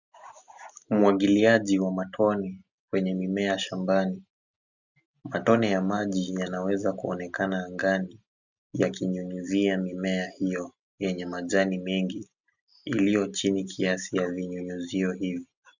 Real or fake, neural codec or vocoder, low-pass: real; none; 7.2 kHz